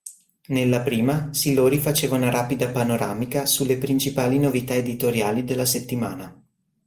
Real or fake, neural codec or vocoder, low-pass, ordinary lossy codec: real; none; 14.4 kHz; Opus, 32 kbps